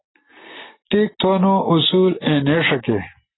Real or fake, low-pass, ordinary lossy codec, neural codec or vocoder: real; 7.2 kHz; AAC, 16 kbps; none